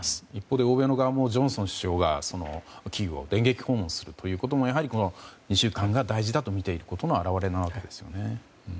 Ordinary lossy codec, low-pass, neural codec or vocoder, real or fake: none; none; none; real